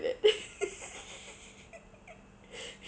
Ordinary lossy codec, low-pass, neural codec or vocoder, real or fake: none; none; none; real